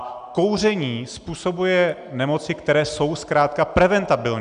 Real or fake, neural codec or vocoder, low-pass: real; none; 9.9 kHz